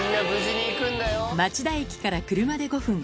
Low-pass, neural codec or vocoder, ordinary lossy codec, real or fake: none; none; none; real